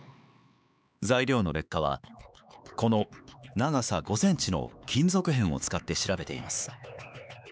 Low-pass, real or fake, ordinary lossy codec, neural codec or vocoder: none; fake; none; codec, 16 kHz, 4 kbps, X-Codec, HuBERT features, trained on LibriSpeech